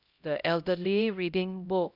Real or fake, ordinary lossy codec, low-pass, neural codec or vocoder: fake; none; 5.4 kHz; codec, 16 kHz, 0.5 kbps, X-Codec, HuBERT features, trained on LibriSpeech